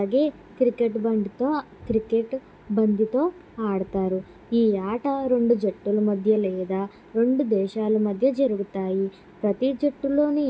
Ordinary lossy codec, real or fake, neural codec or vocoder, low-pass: none; real; none; none